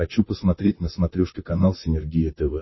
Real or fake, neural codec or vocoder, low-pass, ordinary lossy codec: fake; vocoder, 44.1 kHz, 128 mel bands, Pupu-Vocoder; 7.2 kHz; MP3, 24 kbps